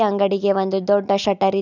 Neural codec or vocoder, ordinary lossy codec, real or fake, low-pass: none; none; real; 7.2 kHz